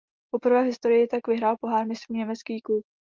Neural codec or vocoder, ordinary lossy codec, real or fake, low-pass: none; Opus, 32 kbps; real; 7.2 kHz